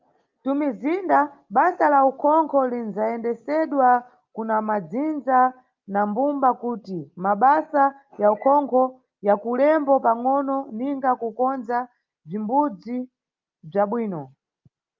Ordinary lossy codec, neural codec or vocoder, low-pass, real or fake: Opus, 24 kbps; none; 7.2 kHz; real